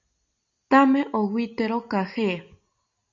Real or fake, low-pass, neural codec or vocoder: real; 7.2 kHz; none